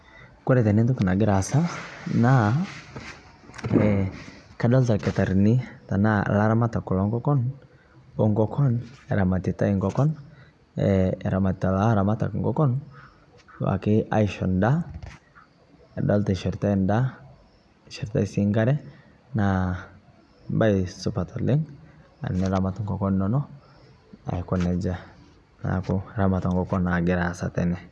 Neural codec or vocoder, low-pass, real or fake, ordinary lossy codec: none; none; real; none